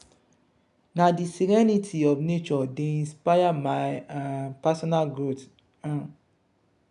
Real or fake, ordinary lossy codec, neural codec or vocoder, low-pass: real; none; none; 10.8 kHz